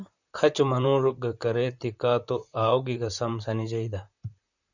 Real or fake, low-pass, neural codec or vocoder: fake; 7.2 kHz; vocoder, 44.1 kHz, 128 mel bands, Pupu-Vocoder